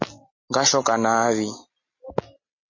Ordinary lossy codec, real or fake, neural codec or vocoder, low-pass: MP3, 32 kbps; real; none; 7.2 kHz